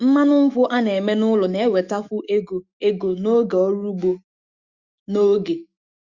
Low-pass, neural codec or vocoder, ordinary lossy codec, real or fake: 7.2 kHz; autoencoder, 48 kHz, 128 numbers a frame, DAC-VAE, trained on Japanese speech; Opus, 64 kbps; fake